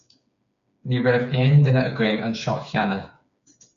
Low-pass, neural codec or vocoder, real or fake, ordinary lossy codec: 7.2 kHz; codec, 16 kHz, 4 kbps, FreqCodec, smaller model; fake; MP3, 64 kbps